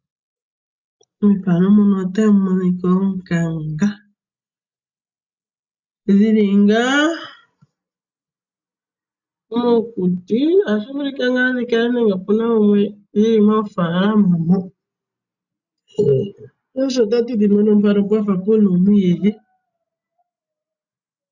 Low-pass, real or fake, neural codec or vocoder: 7.2 kHz; real; none